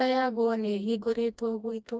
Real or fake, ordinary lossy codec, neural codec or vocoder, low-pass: fake; none; codec, 16 kHz, 1 kbps, FreqCodec, smaller model; none